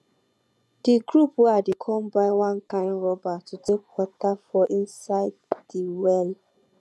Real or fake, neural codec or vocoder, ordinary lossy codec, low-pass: fake; vocoder, 24 kHz, 100 mel bands, Vocos; none; none